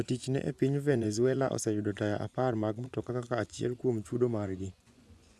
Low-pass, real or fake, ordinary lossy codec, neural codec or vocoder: none; fake; none; vocoder, 24 kHz, 100 mel bands, Vocos